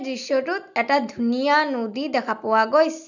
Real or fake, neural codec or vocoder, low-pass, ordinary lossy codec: real; none; 7.2 kHz; none